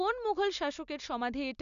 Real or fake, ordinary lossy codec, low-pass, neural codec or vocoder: real; none; 7.2 kHz; none